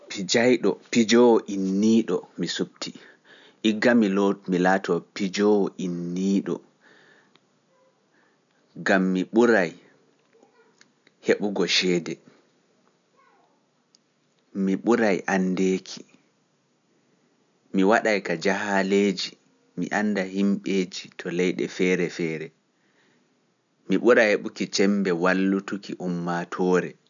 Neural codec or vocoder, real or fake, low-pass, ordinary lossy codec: none; real; 7.2 kHz; none